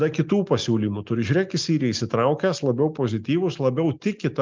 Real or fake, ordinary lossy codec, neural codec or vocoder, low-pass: real; Opus, 24 kbps; none; 7.2 kHz